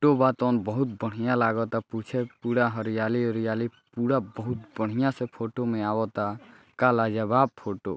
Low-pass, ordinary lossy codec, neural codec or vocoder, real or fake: none; none; none; real